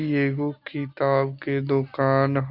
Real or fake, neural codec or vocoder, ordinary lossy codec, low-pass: real; none; Opus, 64 kbps; 5.4 kHz